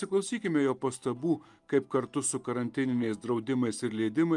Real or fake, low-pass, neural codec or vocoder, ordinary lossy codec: real; 10.8 kHz; none; Opus, 32 kbps